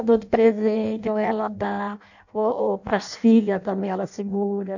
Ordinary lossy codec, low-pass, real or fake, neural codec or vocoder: none; 7.2 kHz; fake; codec, 16 kHz in and 24 kHz out, 0.6 kbps, FireRedTTS-2 codec